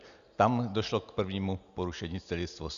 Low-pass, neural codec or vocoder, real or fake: 7.2 kHz; none; real